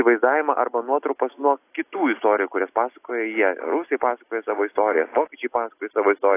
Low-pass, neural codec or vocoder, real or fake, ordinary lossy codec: 3.6 kHz; none; real; AAC, 24 kbps